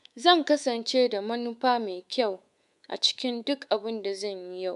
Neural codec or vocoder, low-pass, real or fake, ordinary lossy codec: codec, 24 kHz, 3.1 kbps, DualCodec; 10.8 kHz; fake; none